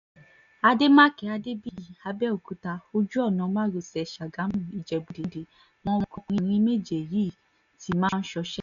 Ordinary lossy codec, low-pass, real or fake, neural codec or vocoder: none; 7.2 kHz; real; none